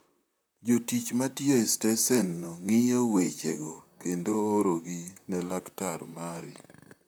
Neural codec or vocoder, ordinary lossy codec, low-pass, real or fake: vocoder, 44.1 kHz, 128 mel bands, Pupu-Vocoder; none; none; fake